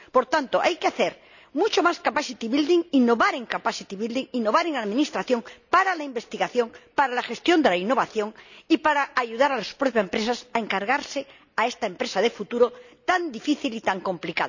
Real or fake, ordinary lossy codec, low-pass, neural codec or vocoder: real; none; 7.2 kHz; none